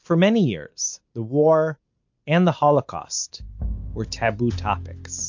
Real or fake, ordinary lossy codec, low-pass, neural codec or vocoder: real; MP3, 48 kbps; 7.2 kHz; none